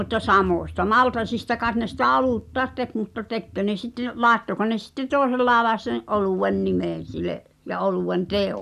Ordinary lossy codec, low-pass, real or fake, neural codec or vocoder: none; 14.4 kHz; real; none